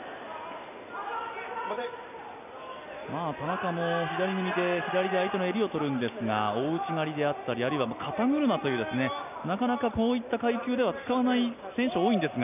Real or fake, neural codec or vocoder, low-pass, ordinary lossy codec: real; none; 3.6 kHz; none